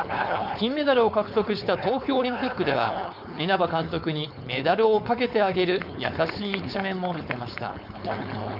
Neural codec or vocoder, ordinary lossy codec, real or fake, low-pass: codec, 16 kHz, 4.8 kbps, FACodec; none; fake; 5.4 kHz